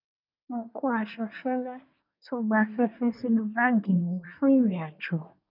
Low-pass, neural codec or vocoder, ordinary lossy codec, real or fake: 5.4 kHz; codec, 24 kHz, 1 kbps, SNAC; none; fake